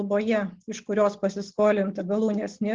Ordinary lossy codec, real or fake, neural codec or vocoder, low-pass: Opus, 16 kbps; real; none; 7.2 kHz